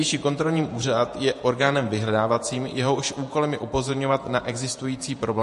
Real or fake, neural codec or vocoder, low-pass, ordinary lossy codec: fake; vocoder, 48 kHz, 128 mel bands, Vocos; 14.4 kHz; MP3, 48 kbps